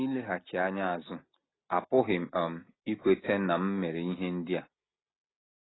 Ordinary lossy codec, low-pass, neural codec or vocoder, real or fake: AAC, 16 kbps; 7.2 kHz; none; real